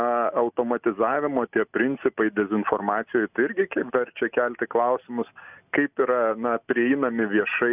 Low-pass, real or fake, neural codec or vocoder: 3.6 kHz; real; none